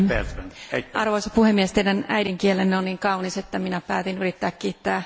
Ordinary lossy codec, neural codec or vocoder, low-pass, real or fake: none; none; none; real